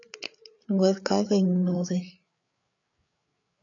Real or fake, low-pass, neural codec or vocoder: fake; 7.2 kHz; codec, 16 kHz, 8 kbps, FreqCodec, larger model